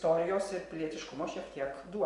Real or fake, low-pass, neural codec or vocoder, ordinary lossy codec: fake; 10.8 kHz; vocoder, 24 kHz, 100 mel bands, Vocos; MP3, 64 kbps